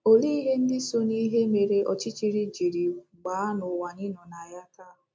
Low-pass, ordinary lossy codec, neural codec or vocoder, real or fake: none; none; none; real